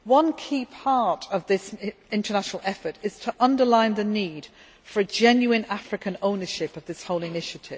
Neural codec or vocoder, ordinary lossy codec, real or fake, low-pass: none; none; real; none